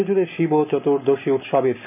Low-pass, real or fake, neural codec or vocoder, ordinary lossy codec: 3.6 kHz; real; none; none